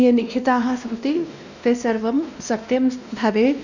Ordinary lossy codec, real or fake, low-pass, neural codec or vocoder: none; fake; 7.2 kHz; codec, 16 kHz, 1 kbps, X-Codec, WavLM features, trained on Multilingual LibriSpeech